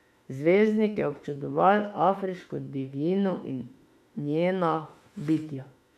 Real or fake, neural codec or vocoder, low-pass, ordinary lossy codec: fake; autoencoder, 48 kHz, 32 numbers a frame, DAC-VAE, trained on Japanese speech; 14.4 kHz; MP3, 96 kbps